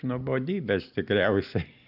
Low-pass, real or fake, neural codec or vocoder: 5.4 kHz; real; none